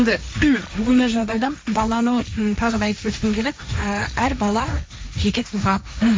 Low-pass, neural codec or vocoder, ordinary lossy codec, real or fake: none; codec, 16 kHz, 1.1 kbps, Voila-Tokenizer; none; fake